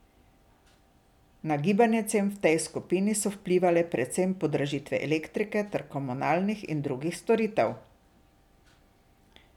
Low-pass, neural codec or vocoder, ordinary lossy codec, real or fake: 19.8 kHz; none; none; real